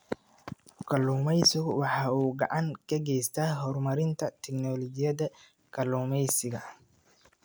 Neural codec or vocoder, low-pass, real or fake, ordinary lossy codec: none; none; real; none